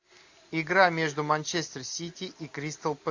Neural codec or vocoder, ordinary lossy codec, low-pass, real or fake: none; AAC, 48 kbps; 7.2 kHz; real